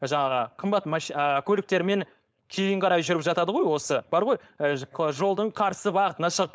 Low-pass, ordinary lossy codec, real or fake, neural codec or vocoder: none; none; fake; codec, 16 kHz, 4.8 kbps, FACodec